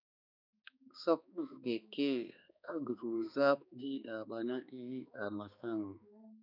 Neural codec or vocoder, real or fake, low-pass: codec, 16 kHz, 2 kbps, X-Codec, HuBERT features, trained on balanced general audio; fake; 5.4 kHz